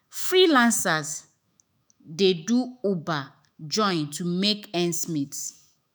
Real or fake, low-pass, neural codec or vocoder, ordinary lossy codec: fake; none; autoencoder, 48 kHz, 128 numbers a frame, DAC-VAE, trained on Japanese speech; none